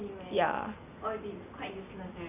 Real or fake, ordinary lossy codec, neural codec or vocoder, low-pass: real; none; none; 3.6 kHz